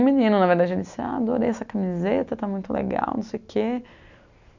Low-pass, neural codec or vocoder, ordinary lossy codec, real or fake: 7.2 kHz; none; none; real